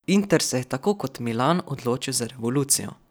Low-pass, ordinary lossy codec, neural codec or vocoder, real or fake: none; none; none; real